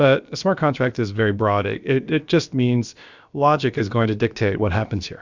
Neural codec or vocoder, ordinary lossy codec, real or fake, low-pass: codec, 16 kHz, about 1 kbps, DyCAST, with the encoder's durations; Opus, 64 kbps; fake; 7.2 kHz